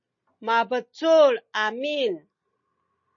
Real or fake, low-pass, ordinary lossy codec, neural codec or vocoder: real; 7.2 kHz; MP3, 32 kbps; none